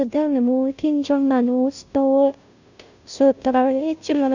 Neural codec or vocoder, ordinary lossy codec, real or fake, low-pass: codec, 16 kHz, 0.5 kbps, FunCodec, trained on Chinese and English, 25 frames a second; none; fake; 7.2 kHz